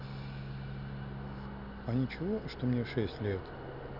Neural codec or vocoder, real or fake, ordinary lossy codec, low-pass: none; real; none; 5.4 kHz